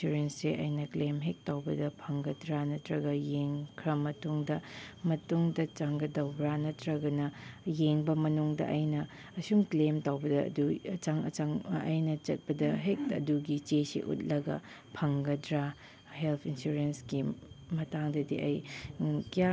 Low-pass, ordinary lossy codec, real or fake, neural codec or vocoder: none; none; real; none